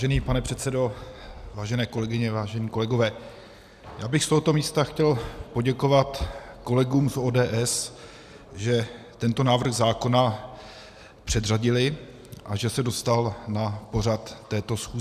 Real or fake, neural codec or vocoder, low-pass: real; none; 14.4 kHz